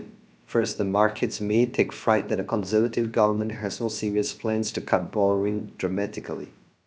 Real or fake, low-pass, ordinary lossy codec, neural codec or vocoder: fake; none; none; codec, 16 kHz, about 1 kbps, DyCAST, with the encoder's durations